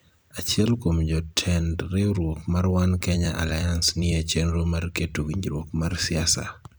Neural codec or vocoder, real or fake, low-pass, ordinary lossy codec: vocoder, 44.1 kHz, 128 mel bands every 256 samples, BigVGAN v2; fake; none; none